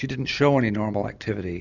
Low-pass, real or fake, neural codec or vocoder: 7.2 kHz; real; none